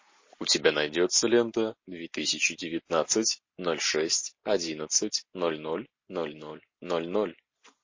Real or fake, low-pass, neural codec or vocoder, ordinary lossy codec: real; 7.2 kHz; none; MP3, 32 kbps